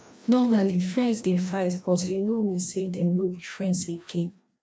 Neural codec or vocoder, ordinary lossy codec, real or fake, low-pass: codec, 16 kHz, 1 kbps, FreqCodec, larger model; none; fake; none